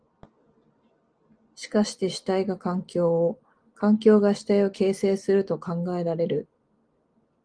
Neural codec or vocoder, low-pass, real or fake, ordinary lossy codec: none; 9.9 kHz; real; Opus, 24 kbps